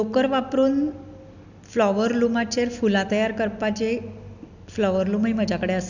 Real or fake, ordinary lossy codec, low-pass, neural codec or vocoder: real; none; 7.2 kHz; none